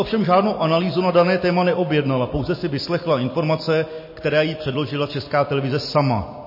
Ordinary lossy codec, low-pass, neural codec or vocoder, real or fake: MP3, 24 kbps; 5.4 kHz; none; real